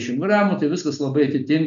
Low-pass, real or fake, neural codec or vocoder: 7.2 kHz; real; none